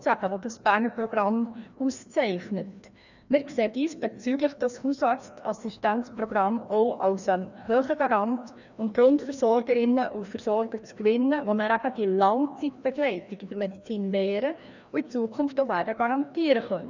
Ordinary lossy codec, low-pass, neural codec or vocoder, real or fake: none; 7.2 kHz; codec, 16 kHz, 1 kbps, FreqCodec, larger model; fake